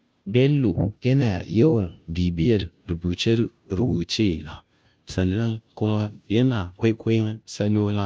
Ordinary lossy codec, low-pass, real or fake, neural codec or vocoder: none; none; fake; codec, 16 kHz, 0.5 kbps, FunCodec, trained on Chinese and English, 25 frames a second